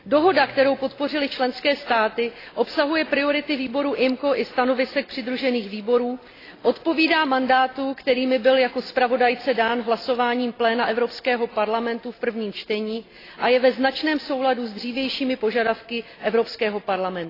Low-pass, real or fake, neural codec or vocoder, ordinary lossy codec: 5.4 kHz; real; none; AAC, 24 kbps